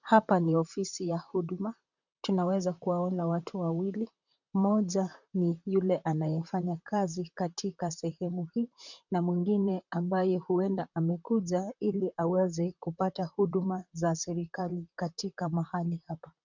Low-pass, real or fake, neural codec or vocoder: 7.2 kHz; fake; vocoder, 44.1 kHz, 128 mel bands, Pupu-Vocoder